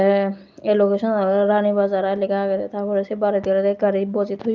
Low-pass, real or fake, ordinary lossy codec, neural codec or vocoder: 7.2 kHz; real; Opus, 24 kbps; none